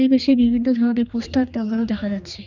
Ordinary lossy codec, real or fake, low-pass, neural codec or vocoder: none; fake; 7.2 kHz; codec, 16 kHz, 2 kbps, X-Codec, HuBERT features, trained on general audio